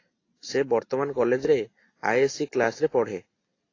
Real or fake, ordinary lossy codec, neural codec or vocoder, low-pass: real; AAC, 32 kbps; none; 7.2 kHz